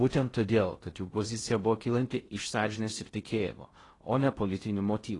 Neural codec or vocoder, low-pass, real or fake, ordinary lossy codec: codec, 16 kHz in and 24 kHz out, 0.6 kbps, FocalCodec, streaming, 2048 codes; 10.8 kHz; fake; AAC, 32 kbps